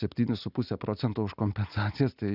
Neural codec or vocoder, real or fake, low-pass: none; real; 5.4 kHz